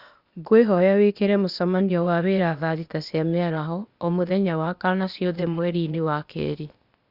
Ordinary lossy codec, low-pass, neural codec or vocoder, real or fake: Opus, 64 kbps; 5.4 kHz; codec, 16 kHz, 0.8 kbps, ZipCodec; fake